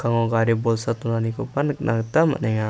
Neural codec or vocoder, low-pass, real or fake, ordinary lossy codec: none; none; real; none